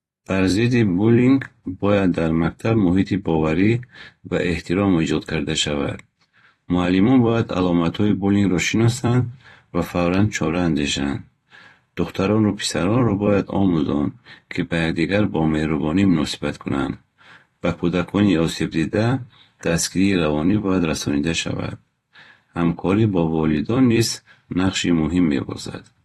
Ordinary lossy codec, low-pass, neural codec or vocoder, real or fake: AAC, 32 kbps; 19.8 kHz; vocoder, 44.1 kHz, 128 mel bands every 256 samples, BigVGAN v2; fake